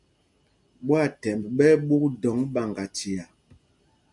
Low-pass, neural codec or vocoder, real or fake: 10.8 kHz; none; real